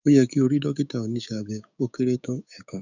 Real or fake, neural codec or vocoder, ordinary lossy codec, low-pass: fake; codec, 16 kHz, 6 kbps, DAC; none; 7.2 kHz